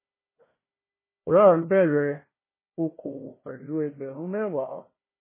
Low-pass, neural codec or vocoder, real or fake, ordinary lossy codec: 3.6 kHz; codec, 16 kHz, 1 kbps, FunCodec, trained on Chinese and English, 50 frames a second; fake; MP3, 16 kbps